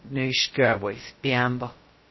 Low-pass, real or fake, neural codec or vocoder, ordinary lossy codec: 7.2 kHz; fake; codec, 16 kHz, 0.2 kbps, FocalCodec; MP3, 24 kbps